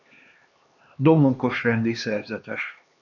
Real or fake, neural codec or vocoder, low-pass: fake; codec, 16 kHz, 2 kbps, X-Codec, HuBERT features, trained on LibriSpeech; 7.2 kHz